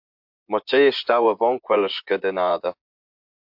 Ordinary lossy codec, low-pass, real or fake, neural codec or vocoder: MP3, 48 kbps; 5.4 kHz; fake; vocoder, 24 kHz, 100 mel bands, Vocos